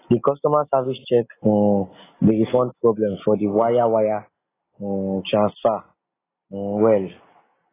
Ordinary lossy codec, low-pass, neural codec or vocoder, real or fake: AAC, 16 kbps; 3.6 kHz; none; real